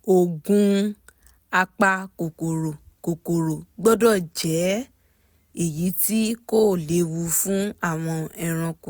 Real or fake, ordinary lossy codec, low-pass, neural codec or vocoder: real; none; none; none